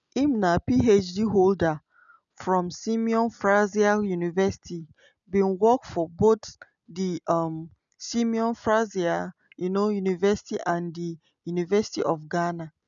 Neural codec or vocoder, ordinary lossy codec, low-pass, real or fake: none; none; 7.2 kHz; real